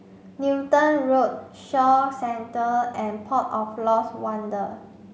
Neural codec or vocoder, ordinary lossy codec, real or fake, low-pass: none; none; real; none